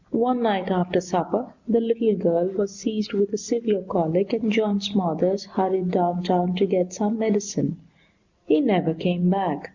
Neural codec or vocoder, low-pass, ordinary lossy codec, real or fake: none; 7.2 kHz; MP3, 64 kbps; real